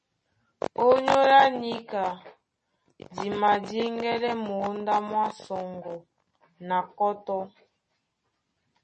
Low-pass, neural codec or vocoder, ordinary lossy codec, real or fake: 10.8 kHz; none; MP3, 32 kbps; real